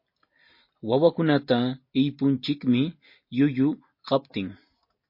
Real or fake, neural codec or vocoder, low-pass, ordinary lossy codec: real; none; 5.4 kHz; MP3, 32 kbps